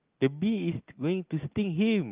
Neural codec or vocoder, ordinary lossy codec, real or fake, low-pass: none; Opus, 32 kbps; real; 3.6 kHz